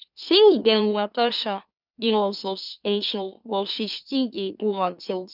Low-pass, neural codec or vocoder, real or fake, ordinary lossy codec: 5.4 kHz; autoencoder, 44.1 kHz, a latent of 192 numbers a frame, MeloTTS; fake; none